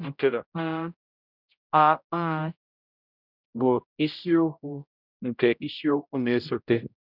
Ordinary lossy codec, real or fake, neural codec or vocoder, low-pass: none; fake; codec, 16 kHz, 0.5 kbps, X-Codec, HuBERT features, trained on general audio; 5.4 kHz